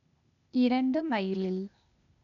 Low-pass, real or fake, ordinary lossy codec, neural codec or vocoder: 7.2 kHz; fake; none; codec, 16 kHz, 0.8 kbps, ZipCodec